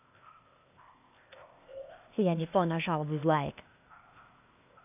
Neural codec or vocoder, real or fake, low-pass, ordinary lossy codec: codec, 16 kHz, 0.8 kbps, ZipCodec; fake; 3.6 kHz; AAC, 32 kbps